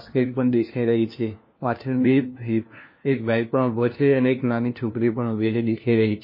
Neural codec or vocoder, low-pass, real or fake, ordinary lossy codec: codec, 16 kHz, 1 kbps, FunCodec, trained on LibriTTS, 50 frames a second; 5.4 kHz; fake; MP3, 24 kbps